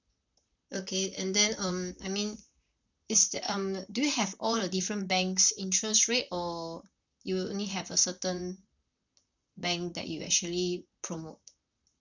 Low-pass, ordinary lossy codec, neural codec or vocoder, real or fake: 7.2 kHz; none; vocoder, 44.1 kHz, 128 mel bands, Pupu-Vocoder; fake